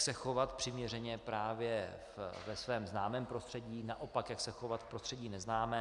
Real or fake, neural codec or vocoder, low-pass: real; none; 10.8 kHz